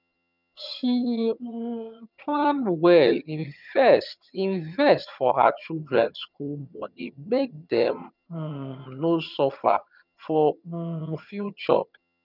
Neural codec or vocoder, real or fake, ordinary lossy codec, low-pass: vocoder, 22.05 kHz, 80 mel bands, HiFi-GAN; fake; none; 5.4 kHz